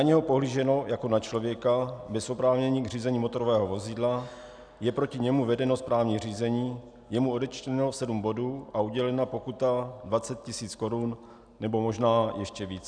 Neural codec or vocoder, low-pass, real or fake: none; 9.9 kHz; real